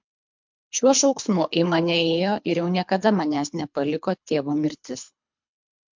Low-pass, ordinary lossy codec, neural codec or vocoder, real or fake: 7.2 kHz; MP3, 64 kbps; codec, 24 kHz, 3 kbps, HILCodec; fake